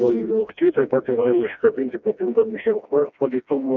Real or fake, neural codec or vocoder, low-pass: fake; codec, 16 kHz, 1 kbps, FreqCodec, smaller model; 7.2 kHz